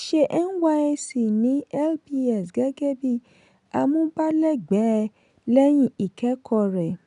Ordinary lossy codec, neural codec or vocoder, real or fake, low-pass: none; none; real; 10.8 kHz